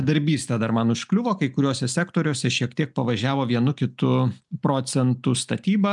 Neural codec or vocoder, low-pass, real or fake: none; 10.8 kHz; real